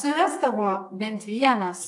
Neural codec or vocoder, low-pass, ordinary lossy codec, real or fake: codec, 24 kHz, 0.9 kbps, WavTokenizer, medium music audio release; 10.8 kHz; AAC, 64 kbps; fake